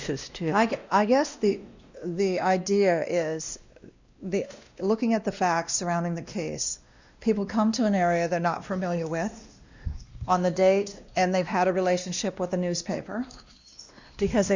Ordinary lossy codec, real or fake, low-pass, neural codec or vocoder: Opus, 64 kbps; fake; 7.2 kHz; codec, 16 kHz, 1 kbps, X-Codec, WavLM features, trained on Multilingual LibriSpeech